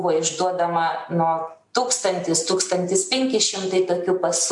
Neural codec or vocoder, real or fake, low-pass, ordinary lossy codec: none; real; 10.8 kHz; MP3, 64 kbps